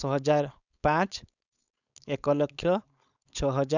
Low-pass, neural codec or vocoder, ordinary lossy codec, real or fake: 7.2 kHz; codec, 16 kHz, 4.8 kbps, FACodec; none; fake